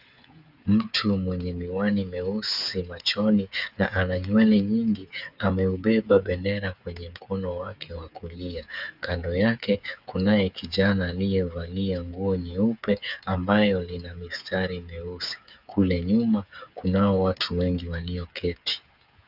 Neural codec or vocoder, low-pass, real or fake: codec, 16 kHz, 8 kbps, FreqCodec, smaller model; 5.4 kHz; fake